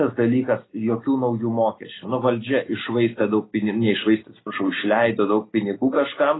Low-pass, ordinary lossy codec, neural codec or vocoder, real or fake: 7.2 kHz; AAC, 16 kbps; none; real